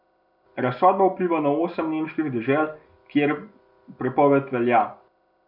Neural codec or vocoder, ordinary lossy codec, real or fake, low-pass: none; none; real; 5.4 kHz